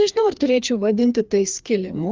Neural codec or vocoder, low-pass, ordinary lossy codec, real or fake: codec, 16 kHz, 2 kbps, FreqCodec, larger model; 7.2 kHz; Opus, 32 kbps; fake